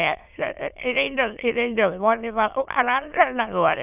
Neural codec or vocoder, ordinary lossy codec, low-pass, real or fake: autoencoder, 22.05 kHz, a latent of 192 numbers a frame, VITS, trained on many speakers; none; 3.6 kHz; fake